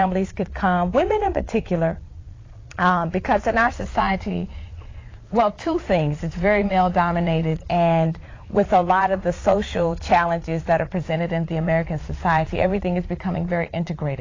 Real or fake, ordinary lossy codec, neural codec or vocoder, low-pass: fake; AAC, 32 kbps; vocoder, 22.05 kHz, 80 mel bands, Vocos; 7.2 kHz